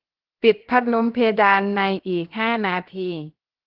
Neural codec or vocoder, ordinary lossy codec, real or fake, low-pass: codec, 16 kHz, 0.7 kbps, FocalCodec; Opus, 16 kbps; fake; 5.4 kHz